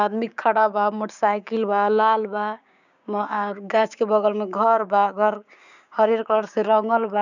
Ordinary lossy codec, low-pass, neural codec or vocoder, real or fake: none; 7.2 kHz; codec, 16 kHz, 6 kbps, DAC; fake